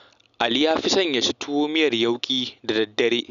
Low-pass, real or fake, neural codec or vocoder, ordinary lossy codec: 7.2 kHz; real; none; none